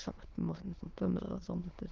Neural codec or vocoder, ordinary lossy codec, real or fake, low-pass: autoencoder, 22.05 kHz, a latent of 192 numbers a frame, VITS, trained on many speakers; Opus, 16 kbps; fake; 7.2 kHz